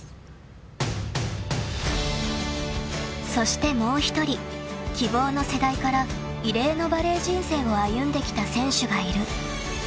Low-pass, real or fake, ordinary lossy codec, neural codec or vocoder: none; real; none; none